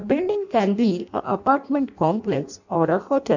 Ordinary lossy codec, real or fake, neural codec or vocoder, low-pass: none; fake; codec, 16 kHz in and 24 kHz out, 0.6 kbps, FireRedTTS-2 codec; 7.2 kHz